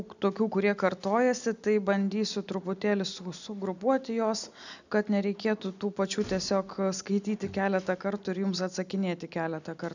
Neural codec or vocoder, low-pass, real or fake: none; 7.2 kHz; real